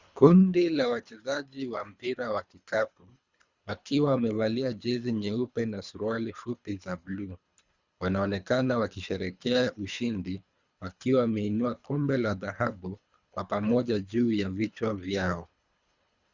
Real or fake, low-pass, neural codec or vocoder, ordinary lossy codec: fake; 7.2 kHz; codec, 24 kHz, 3 kbps, HILCodec; Opus, 64 kbps